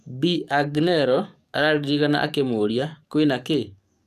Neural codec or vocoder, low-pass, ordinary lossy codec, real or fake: codec, 44.1 kHz, 7.8 kbps, DAC; 14.4 kHz; none; fake